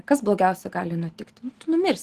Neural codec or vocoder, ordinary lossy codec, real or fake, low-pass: none; Opus, 16 kbps; real; 14.4 kHz